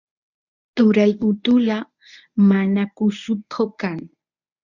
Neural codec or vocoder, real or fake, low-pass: codec, 24 kHz, 0.9 kbps, WavTokenizer, medium speech release version 1; fake; 7.2 kHz